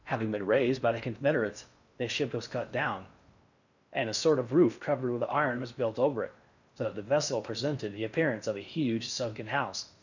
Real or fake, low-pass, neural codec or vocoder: fake; 7.2 kHz; codec, 16 kHz in and 24 kHz out, 0.6 kbps, FocalCodec, streaming, 4096 codes